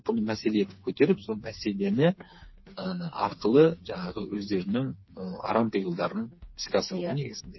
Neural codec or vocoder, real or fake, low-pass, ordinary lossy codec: codec, 16 kHz, 4 kbps, FreqCodec, smaller model; fake; 7.2 kHz; MP3, 24 kbps